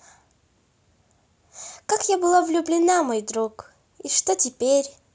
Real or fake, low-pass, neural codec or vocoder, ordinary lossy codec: real; none; none; none